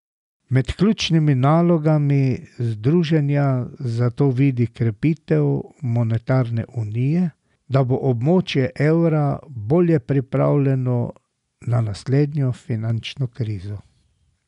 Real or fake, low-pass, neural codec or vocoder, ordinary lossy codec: real; 10.8 kHz; none; none